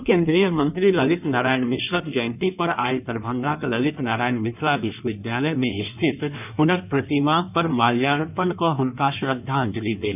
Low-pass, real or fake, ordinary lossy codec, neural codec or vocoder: 3.6 kHz; fake; none; codec, 16 kHz in and 24 kHz out, 1.1 kbps, FireRedTTS-2 codec